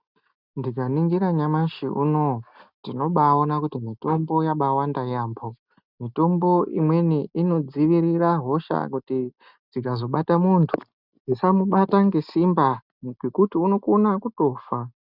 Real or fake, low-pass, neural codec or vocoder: real; 5.4 kHz; none